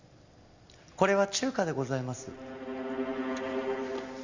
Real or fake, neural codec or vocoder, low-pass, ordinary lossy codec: real; none; 7.2 kHz; Opus, 64 kbps